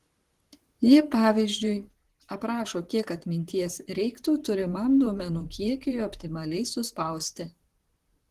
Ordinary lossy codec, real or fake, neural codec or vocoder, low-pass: Opus, 16 kbps; fake; vocoder, 44.1 kHz, 128 mel bands, Pupu-Vocoder; 14.4 kHz